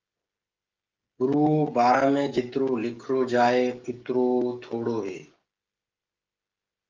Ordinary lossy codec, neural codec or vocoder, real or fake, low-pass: Opus, 32 kbps; codec, 16 kHz, 16 kbps, FreqCodec, smaller model; fake; 7.2 kHz